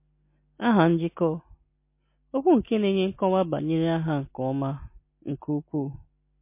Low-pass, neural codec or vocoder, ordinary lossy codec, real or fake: 3.6 kHz; none; MP3, 24 kbps; real